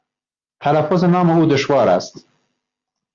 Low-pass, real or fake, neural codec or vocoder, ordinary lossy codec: 7.2 kHz; real; none; Opus, 32 kbps